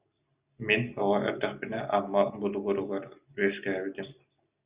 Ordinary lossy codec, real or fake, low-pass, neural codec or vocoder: Opus, 64 kbps; real; 3.6 kHz; none